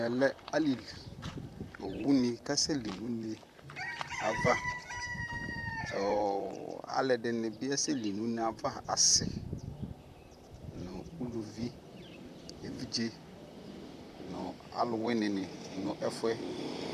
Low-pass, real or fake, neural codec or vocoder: 14.4 kHz; fake; vocoder, 44.1 kHz, 128 mel bands, Pupu-Vocoder